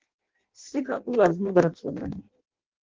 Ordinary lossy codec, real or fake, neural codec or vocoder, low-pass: Opus, 16 kbps; fake; codec, 16 kHz in and 24 kHz out, 0.6 kbps, FireRedTTS-2 codec; 7.2 kHz